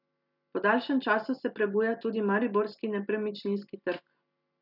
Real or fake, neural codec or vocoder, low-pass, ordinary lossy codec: real; none; 5.4 kHz; none